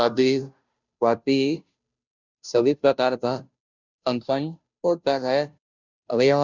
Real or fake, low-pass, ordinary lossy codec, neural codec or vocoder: fake; 7.2 kHz; none; codec, 16 kHz, 0.5 kbps, FunCodec, trained on Chinese and English, 25 frames a second